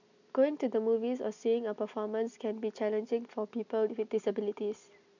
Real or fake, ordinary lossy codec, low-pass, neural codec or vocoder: real; none; 7.2 kHz; none